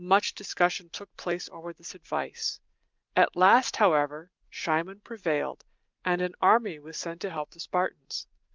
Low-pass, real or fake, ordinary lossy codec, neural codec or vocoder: 7.2 kHz; real; Opus, 32 kbps; none